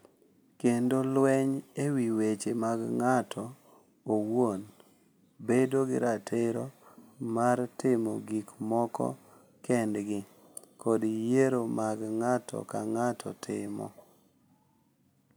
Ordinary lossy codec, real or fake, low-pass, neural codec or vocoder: none; real; none; none